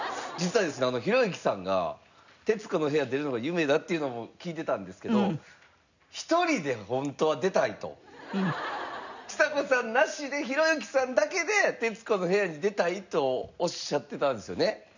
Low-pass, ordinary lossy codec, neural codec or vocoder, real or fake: 7.2 kHz; none; none; real